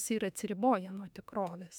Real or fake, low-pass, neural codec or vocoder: fake; 19.8 kHz; autoencoder, 48 kHz, 32 numbers a frame, DAC-VAE, trained on Japanese speech